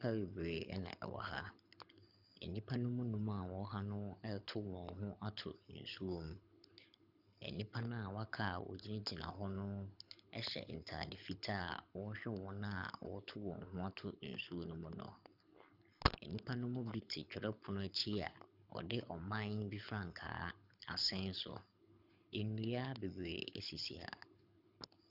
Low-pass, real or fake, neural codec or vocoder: 5.4 kHz; fake; codec, 24 kHz, 6 kbps, HILCodec